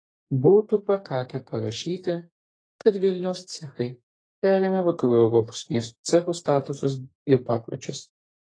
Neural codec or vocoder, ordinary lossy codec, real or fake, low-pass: codec, 32 kHz, 1.9 kbps, SNAC; AAC, 32 kbps; fake; 9.9 kHz